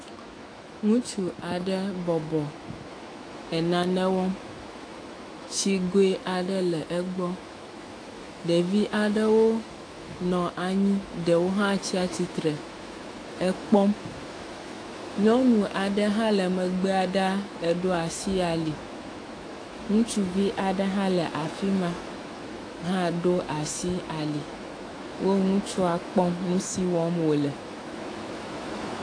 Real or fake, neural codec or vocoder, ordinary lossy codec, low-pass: fake; autoencoder, 48 kHz, 128 numbers a frame, DAC-VAE, trained on Japanese speech; AAC, 48 kbps; 9.9 kHz